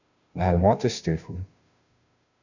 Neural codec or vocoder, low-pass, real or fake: codec, 16 kHz, 0.5 kbps, FunCodec, trained on Chinese and English, 25 frames a second; 7.2 kHz; fake